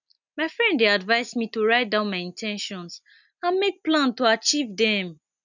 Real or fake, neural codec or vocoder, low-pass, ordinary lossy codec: real; none; 7.2 kHz; none